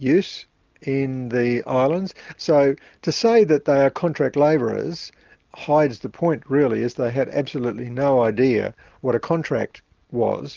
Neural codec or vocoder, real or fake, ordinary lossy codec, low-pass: none; real; Opus, 24 kbps; 7.2 kHz